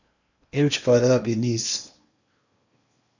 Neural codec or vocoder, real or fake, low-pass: codec, 16 kHz in and 24 kHz out, 0.8 kbps, FocalCodec, streaming, 65536 codes; fake; 7.2 kHz